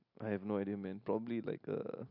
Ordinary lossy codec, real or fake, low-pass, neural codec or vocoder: AAC, 48 kbps; real; 5.4 kHz; none